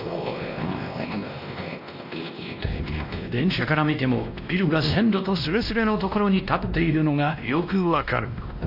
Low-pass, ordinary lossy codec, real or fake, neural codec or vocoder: 5.4 kHz; AAC, 48 kbps; fake; codec, 16 kHz, 1 kbps, X-Codec, WavLM features, trained on Multilingual LibriSpeech